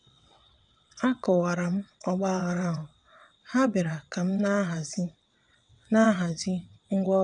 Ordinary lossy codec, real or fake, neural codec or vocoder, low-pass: none; fake; vocoder, 22.05 kHz, 80 mel bands, WaveNeXt; 9.9 kHz